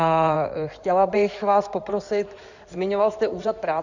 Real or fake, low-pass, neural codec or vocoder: fake; 7.2 kHz; codec, 16 kHz in and 24 kHz out, 2.2 kbps, FireRedTTS-2 codec